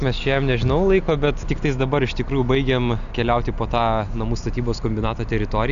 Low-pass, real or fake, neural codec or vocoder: 7.2 kHz; real; none